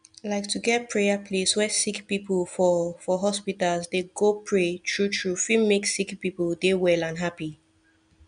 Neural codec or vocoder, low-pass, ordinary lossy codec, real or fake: none; 9.9 kHz; MP3, 96 kbps; real